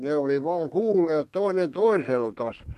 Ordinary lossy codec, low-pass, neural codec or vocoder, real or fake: MP3, 64 kbps; 14.4 kHz; codec, 32 kHz, 1.9 kbps, SNAC; fake